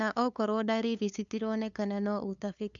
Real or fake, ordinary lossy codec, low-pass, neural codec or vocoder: fake; none; 7.2 kHz; codec, 16 kHz, 2 kbps, FunCodec, trained on LibriTTS, 25 frames a second